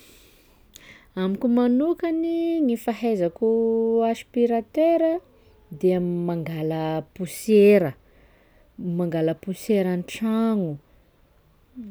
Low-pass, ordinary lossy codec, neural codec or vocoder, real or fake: none; none; none; real